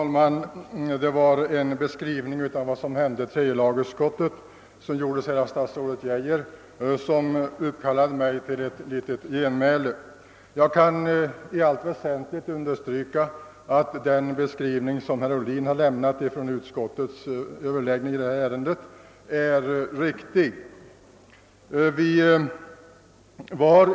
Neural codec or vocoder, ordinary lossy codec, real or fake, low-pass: none; none; real; none